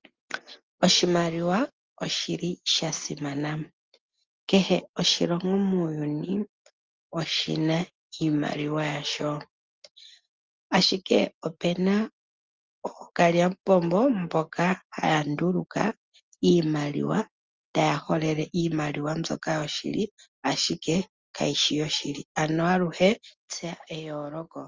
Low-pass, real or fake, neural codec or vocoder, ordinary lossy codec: 7.2 kHz; real; none; Opus, 32 kbps